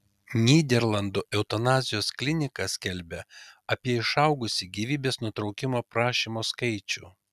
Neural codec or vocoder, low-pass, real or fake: none; 14.4 kHz; real